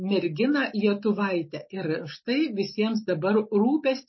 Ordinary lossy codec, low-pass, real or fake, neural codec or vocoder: MP3, 24 kbps; 7.2 kHz; real; none